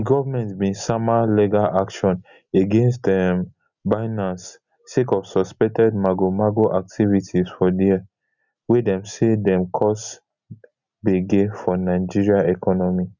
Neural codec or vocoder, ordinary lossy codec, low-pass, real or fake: none; none; 7.2 kHz; real